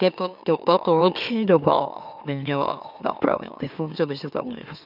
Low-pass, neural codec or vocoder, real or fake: 5.4 kHz; autoencoder, 44.1 kHz, a latent of 192 numbers a frame, MeloTTS; fake